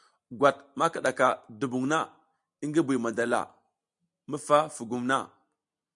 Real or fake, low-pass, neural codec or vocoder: real; 10.8 kHz; none